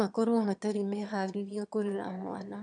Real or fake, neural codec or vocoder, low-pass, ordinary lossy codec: fake; autoencoder, 22.05 kHz, a latent of 192 numbers a frame, VITS, trained on one speaker; 9.9 kHz; none